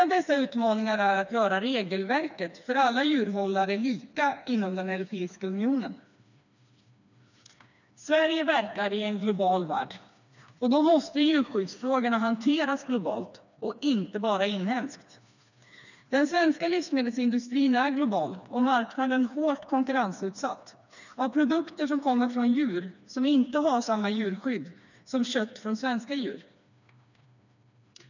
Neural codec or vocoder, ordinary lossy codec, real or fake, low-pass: codec, 16 kHz, 2 kbps, FreqCodec, smaller model; none; fake; 7.2 kHz